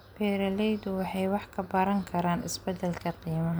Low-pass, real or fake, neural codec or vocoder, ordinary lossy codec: none; real; none; none